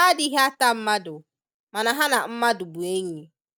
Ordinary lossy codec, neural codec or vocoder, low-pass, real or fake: none; none; none; real